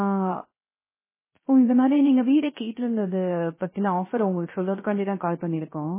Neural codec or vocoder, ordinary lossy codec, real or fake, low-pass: codec, 16 kHz, 0.3 kbps, FocalCodec; MP3, 16 kbps; fake; 3.6 kHz